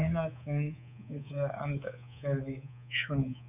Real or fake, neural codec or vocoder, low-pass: fake; codec, 24 kHz, 3.1 kbps, DualCodec; 3.6 kHz